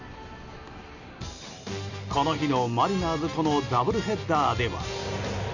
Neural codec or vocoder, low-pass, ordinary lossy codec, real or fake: none; 7.2 kHz; none; real